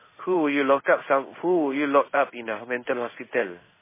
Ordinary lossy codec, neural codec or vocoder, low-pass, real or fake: MP3, 16 kbps; codec, 16 kHz in and 24 kHz out, 1 kbps, XY-Tokenizer; 3.6 kHz; fake